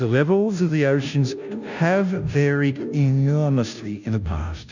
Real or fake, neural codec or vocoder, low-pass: fake; codec, 16 kHz, 0.5 kbps, FunCodec, trained on Chinese and English, 25 frames a second; 7.2 kHz